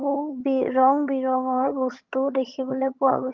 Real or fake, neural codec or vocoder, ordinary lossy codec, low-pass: fake; vocoder, 22.05 kHz, 80 mel bands, HiFi-GAN; Opus, 32 kbps; 7.2 kHz